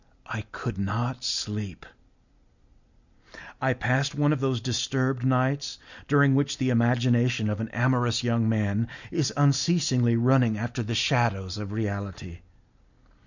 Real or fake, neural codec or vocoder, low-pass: real; none; 7.2 kHz